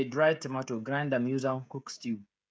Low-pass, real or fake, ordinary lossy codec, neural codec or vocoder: none; fake; none; codec, 16 kHz, 16 kbps, FreqCodec, smaller model